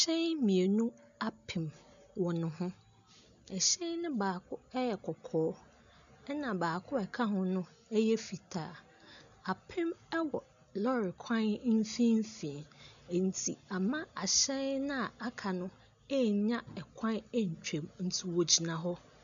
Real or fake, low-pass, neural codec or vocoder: real; 7.2 kHz; none